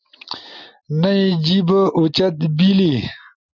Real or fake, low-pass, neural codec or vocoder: real; 7.2 kHz; none